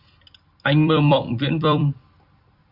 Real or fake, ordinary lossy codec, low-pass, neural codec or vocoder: fake; Opus, 64 kbps; 5.4 kHz; vocoder, 44.1 kHz, 128 mel bands every 256 samples, BigVGAN v2